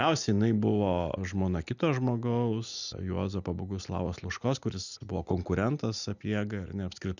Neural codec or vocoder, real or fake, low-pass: none; real; 7.2 kHz